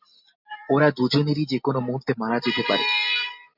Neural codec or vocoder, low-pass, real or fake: none; 5.4 kHz; real